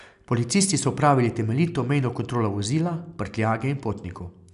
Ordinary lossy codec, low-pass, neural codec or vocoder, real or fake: none; 10.8 kHz; none; real